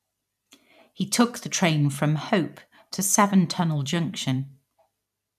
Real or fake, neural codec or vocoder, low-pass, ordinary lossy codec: fake; vocoder, 44.1 kHz, 128 mel bands every 256 samples, BigVGAN v2; 14.4 kHz; none